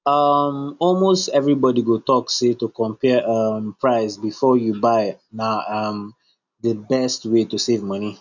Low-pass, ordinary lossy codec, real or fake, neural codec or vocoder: 7.2 kHz; none; real; none